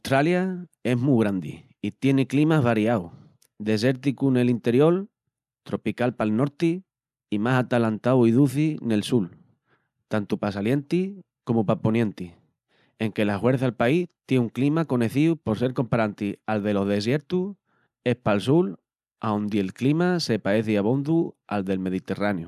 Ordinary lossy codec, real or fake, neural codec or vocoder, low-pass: none; real; none; 14.4 kHz